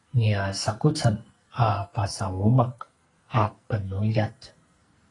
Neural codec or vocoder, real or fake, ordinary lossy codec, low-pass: codec, 44.1 kHz, 2.6 kbps, SNAC; fake; AAC, 32 kbps; 10.8 kHz